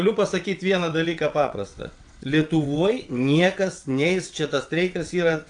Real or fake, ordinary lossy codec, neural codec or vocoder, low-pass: fake; AAC, 64 kbps; vocoder, 22.05 kHz, 80 mel bands, WaveNeXt; 9.9 kHz